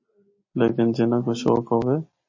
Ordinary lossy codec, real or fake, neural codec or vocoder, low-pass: MP3, 32 kbps; real; none; 7.2 kHz